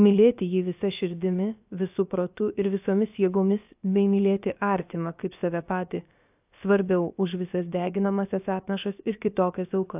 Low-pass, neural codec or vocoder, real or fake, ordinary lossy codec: 3.6 kHz; codec, 16 kHz, about 1 kbps, DyCAST, with the encoder's durations; fake; AAC, 32 kbps